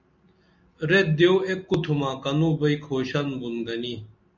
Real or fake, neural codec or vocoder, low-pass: real; none; 7.2 kHz